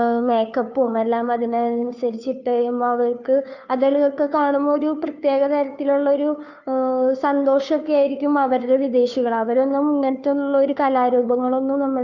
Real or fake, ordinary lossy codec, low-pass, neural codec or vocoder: fake; none; 7.2 kHz; codec, 16 kHz, 2 kbps, FunCodec, trained on Chinese and English, 25 frames a second